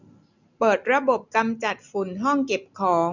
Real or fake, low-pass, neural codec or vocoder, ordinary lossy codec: real; 7.2 kHz; none; none